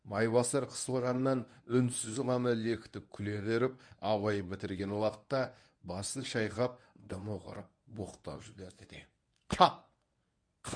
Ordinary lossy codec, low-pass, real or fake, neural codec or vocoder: none; 9.9 kHz; fake; codec, 24 kHz, 0.9 kbps, WavTokenizer, medium speech release version 1